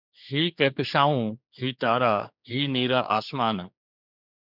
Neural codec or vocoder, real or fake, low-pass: codec, 16 kHz, 4 kbps, X-Codec, HuBERT features, trained on general audio; fake; 5.4 kHz